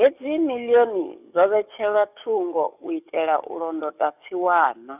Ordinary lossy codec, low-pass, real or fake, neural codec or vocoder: none; 3.6 kHz; fake; codec, 44.1 kHz, 7.8 kbps, DAC